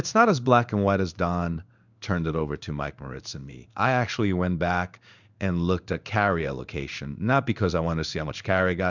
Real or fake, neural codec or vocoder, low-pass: fake; codec, 16 kHz in and 24 kHz out, 1 kbps, XY-Tokenizer; 7.2 kHz